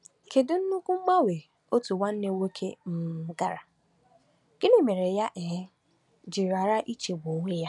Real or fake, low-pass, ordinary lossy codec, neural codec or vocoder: real; 10.8 kHz; none; none